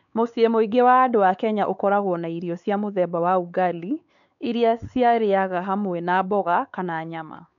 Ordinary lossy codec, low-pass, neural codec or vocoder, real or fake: none; 7.2 kHz; codec, 16 kHz, 4 kbps, X-Codec, WavLM features, trained on Multilingual LibriSpeech; fake